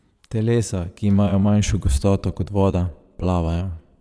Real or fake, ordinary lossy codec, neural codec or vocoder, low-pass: fake; none; vocoder, 22.05 kHz, 80 mel bands, Vocos; none